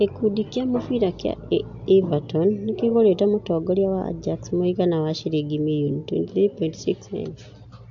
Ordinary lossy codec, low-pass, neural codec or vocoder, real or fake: AAC, 64 kbps; 7.2 kHz; none; real